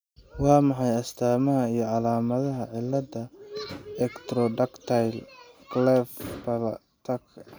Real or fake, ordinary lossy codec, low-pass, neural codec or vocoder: real; none; none; none